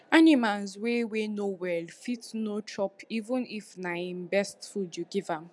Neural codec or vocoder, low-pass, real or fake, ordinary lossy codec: none; none; real; none